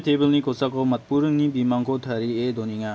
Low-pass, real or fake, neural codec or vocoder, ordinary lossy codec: none; real; none; none